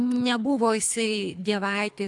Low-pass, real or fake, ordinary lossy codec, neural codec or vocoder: 10.8 kHz; fake; AAC, 64 kbps; codec, 24 kHz, 3 kbps, HILCodec